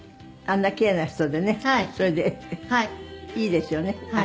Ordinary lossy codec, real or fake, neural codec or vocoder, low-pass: none; real; none; none